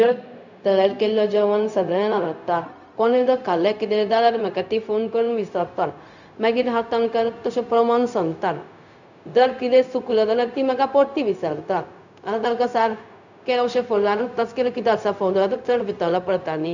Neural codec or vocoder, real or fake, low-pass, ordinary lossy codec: codec, 16 kHz, 0.4 kbps, LongCat-Audio-Codec; fake; 7.2 kHz; none